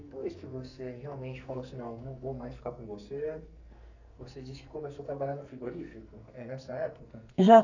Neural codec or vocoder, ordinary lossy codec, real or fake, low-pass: codec, 44.1 kHz, 2.6 kbps, SNAC; none; fake; 7.2 kHz